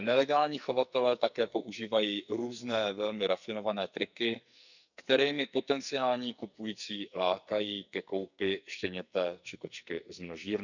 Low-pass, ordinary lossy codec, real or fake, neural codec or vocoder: 7.2 kHz; none; fake; codec, 44.1 kHz, 2.6 kbps, SNAC